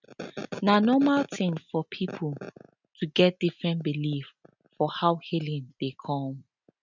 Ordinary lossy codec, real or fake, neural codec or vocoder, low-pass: none; real; none; 7.2 kHz